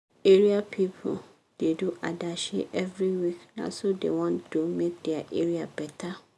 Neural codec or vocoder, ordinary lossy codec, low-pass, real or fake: none; none; none; real